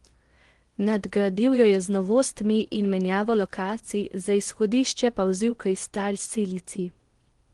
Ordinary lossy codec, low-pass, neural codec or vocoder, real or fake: Opus, 24 kbps; 10.8 kHz; codec, 16 kHz in and 24 kHz out, 0.8 kbps, FocalCodec, streaming, 65536 codes; fake